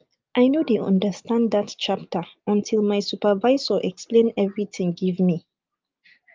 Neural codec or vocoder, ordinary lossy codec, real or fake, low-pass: none; Opus, 32 kbps; real; 7.2 kHz